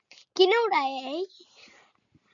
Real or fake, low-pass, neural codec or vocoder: real; 7.2 kHz; none